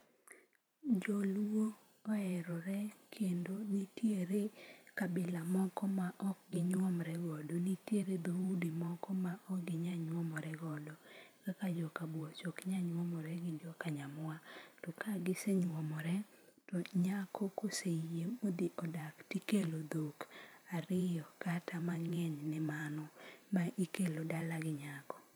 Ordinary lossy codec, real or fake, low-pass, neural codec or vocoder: none; fake; none; vocoder, 44.1 kHz, 128 mel bands every 512 samples, BigVGAN v2